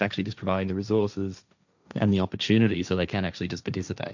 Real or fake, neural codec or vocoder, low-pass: fake; codec, 16 kHz, 1.1 kbps, Voila-Tokenizer; 7.2 kHz